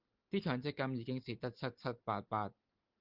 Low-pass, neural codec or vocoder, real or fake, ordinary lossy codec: 5.4 kHz; none; real; Opus, 32 kbps